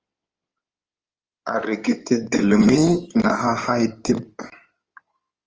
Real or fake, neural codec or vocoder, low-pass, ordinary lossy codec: fake; codec, 16 kHz in and 24 kHz out, 2.2 kbps, FireRedTTS-2 codec; 7.2 kHz; Opus, 24 kbps